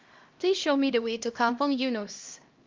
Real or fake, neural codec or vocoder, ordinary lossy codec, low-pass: fake; codec, 16 kHz, 1 kbps, X-Codec, HuBERT features, trained on LibriSpeech; Opus, 32 kbps; 7.2 kHz